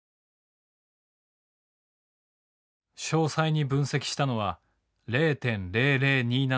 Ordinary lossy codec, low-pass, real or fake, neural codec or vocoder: none; none; real; none